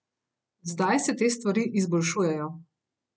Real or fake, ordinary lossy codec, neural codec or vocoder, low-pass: real; none; none; none